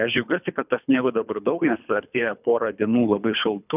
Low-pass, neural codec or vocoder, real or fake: 3.6 kHz; codec, 24 kHz, 3 kbps, HILCodec; fake